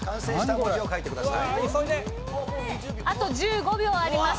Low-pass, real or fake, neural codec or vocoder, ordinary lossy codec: none; real; none; none